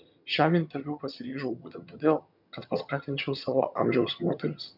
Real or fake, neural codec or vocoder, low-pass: fake; vocoder, 22.05 kHz, 80 mel bands, HiFi-GAN; 5.4 kHz